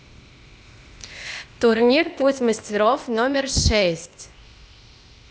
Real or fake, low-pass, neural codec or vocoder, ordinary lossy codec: fake; none; codec, 16 kHz, 0.8 kbps, ZipCodec; none